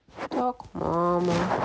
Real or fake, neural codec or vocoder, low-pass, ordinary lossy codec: real; none; none; none